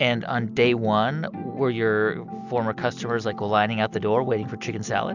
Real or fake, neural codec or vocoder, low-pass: real; none; 7.2 kHz